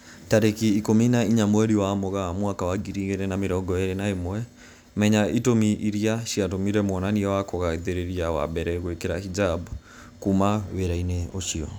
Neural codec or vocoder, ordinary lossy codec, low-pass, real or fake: none; none; none; real